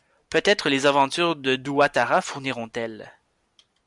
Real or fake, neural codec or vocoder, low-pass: real; none; 10.8 kHz